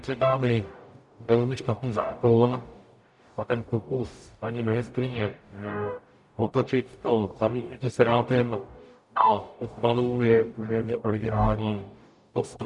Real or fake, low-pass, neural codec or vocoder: fake; 10.8 kHz; codec, 44.1 kHz, 0.9 kbps, DAC